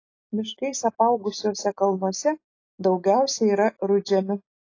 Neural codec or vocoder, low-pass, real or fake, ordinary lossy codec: none; 7.2 kHz; real; AAC, 32 kbps